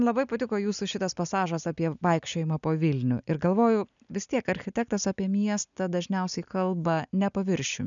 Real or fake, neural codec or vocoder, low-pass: real; none; 7.2 kHz